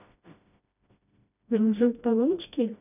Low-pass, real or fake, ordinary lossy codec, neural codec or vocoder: 3.6 kHz; fake; none; codec, 16 kHz, 1 kbps, FreqCodec, smaller model